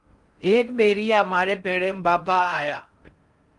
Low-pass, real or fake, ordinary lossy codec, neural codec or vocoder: 10.8 kHz; fake; Opus, 32 kbps; codec, 16 kHz in and 24 kHz out, 0.6 kbps, FocalCodec, streaming, 4096 codes